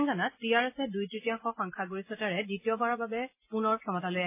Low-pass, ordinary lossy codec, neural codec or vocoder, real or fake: 3.6 kHz; MP3, 24 kbps; none; real